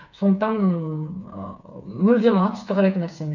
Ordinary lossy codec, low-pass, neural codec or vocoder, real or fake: none; 7.2 kHz; codec, 16 kHz, 4 kbps, FreqCodec, smaller model; fake